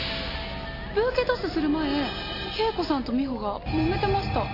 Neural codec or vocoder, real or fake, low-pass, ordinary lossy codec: none; real; 5.4 kHz; AAC, 32 kbps